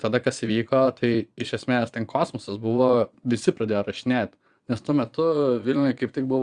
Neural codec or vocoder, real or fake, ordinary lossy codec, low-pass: vocoder, 22.05 kHz, 80 mel bands, Vocos; fake; Opus, 64 kbps; 9.9 kHz